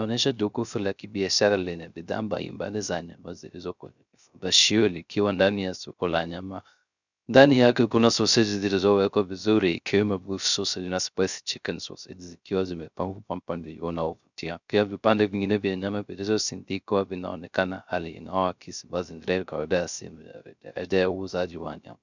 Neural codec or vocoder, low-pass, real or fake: codec, 16 kHz, 0.3 kbps, FocalCodec; 7.2 kHz; fake